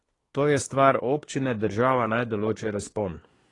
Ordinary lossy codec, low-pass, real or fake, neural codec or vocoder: AAC, 32 kbps; 10.8 kHz; fake; codec, 24 kHz, 1 kbps, SNAC